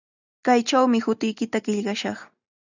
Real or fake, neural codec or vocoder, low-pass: real; none; 7.2 kHz